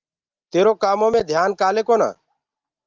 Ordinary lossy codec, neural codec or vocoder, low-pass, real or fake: Opus, 32 kbps; none; 7.2 kHz; real